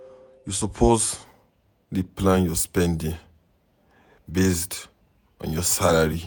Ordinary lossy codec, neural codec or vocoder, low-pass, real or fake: none; vocoder, 48 kHz, 128 mel bands, Vocos; none; fake